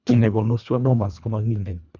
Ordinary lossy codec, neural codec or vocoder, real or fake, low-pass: none; codec, 24 kHz, 1.5 kbps, HILCodec; fake; 7.2 kHz